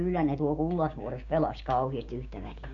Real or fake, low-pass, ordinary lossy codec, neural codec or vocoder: fake; 7.2 kHz; none; codec, 16 kHz, 6 kbps, DAC